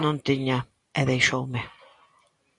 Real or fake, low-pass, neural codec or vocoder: real; 10.8 kHz; none